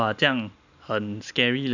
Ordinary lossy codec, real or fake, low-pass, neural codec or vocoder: none; real; 7.2 kHz; none